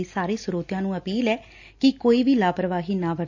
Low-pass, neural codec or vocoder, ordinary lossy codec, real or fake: 7.2 kHz; none; AAC, 48 kbps; real